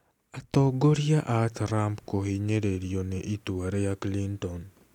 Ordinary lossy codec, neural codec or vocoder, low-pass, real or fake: none; none; 19.8 kHz; real